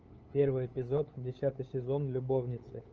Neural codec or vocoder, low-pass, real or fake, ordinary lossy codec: codec, 16 kHz, 8 kbps, FunCodec, trained on LibriTTS, 25 frames a second; 7.2 kHz; fake; Opus, 24 kbps